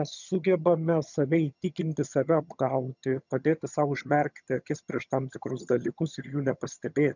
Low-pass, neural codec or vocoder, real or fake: 7.2 kHz; vocoder, 22.05 kHz, 80 mel bands, HiFi-GAN; fake